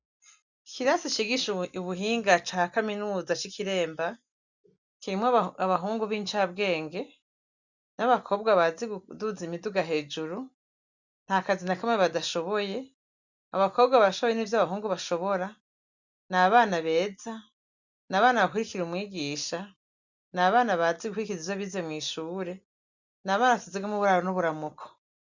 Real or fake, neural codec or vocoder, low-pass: real; none; 7.2 kHz